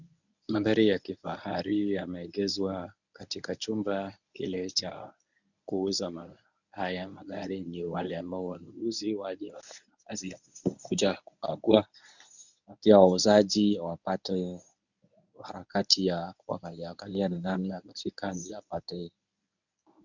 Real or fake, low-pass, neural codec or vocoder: fake; 7.2 kHz; codec, 24 kHz, 0.9 kbps, WavTokenizer, medium speech release version 1